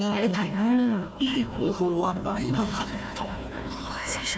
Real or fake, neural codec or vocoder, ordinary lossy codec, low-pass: fake; codec, 16 kHz, 1 kbps, FunCodec, trained on Chinese and English, 50 frames a second; none; none